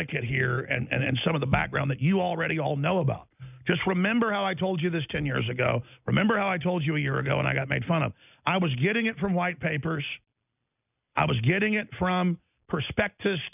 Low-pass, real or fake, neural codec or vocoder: 3.6 kHz; real; none